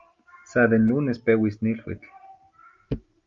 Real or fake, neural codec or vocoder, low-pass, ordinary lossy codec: real; none; 7.2 kHz; Opus, 32 kbps